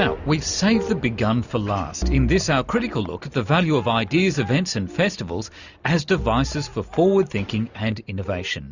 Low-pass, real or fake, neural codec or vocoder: 7.2 kHz; real; none